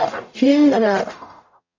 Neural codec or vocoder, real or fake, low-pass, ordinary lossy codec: codec, 44.1 kHz, 0.9 kbps, DAC; fake; 7.2 kHz; MP3, 64 kbps